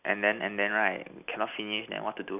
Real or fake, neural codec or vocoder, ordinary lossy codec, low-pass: real; none; none; 3.6 kHz